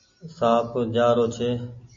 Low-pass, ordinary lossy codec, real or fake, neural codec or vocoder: 7.2 kHz; MP3, 32 kbps; real; none